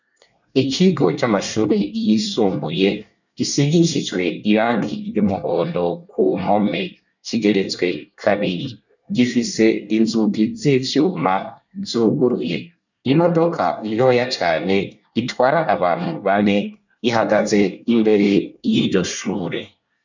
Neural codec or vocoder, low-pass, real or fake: codec, 24 kHz, 1 kbps, SNAC; 7.2 kHz; fake